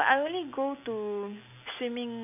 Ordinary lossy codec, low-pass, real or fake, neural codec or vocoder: AAC, 32 kbps; 3.6 kHz; real; none